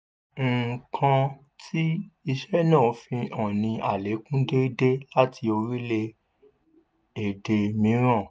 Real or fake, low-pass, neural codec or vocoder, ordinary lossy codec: real; none; none; none